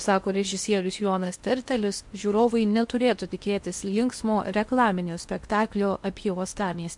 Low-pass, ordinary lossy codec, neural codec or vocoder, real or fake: 10.8 kHz; MP3, 64 kbps; codec, 16 kHz in and 24 kHz out, 0.8 kbps, FocalCodec, streaming, 65536 codes; fake